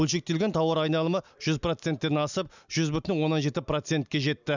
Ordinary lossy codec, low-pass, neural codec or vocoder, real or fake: none; 7.2 kHz; none; real